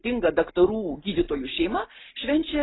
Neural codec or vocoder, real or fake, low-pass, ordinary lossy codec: none; real; 7.2 kHz; AAC, 16 kbps